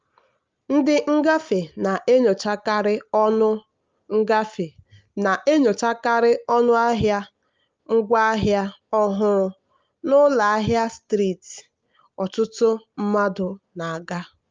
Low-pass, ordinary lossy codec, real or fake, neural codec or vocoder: 7.2 kHz; Opus, 24 kbps; real; none